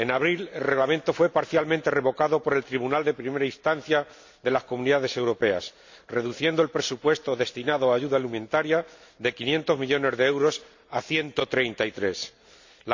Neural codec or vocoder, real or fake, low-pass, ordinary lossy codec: none; real; 7.2 kHz; MP3, 64 kbps